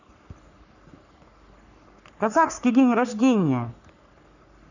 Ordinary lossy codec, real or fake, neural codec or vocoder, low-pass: none; fake; codec, 44.1 kHz, 3.4 kbps, Pupu-Codec; 7.2 kHz